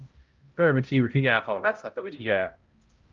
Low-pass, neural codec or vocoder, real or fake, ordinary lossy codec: 7.2 kHz; codec, 16 kHz, 0.5 kbps, X-Codec, HuBERT features, trained on balanced general audio; fake; Opus, 24 kbps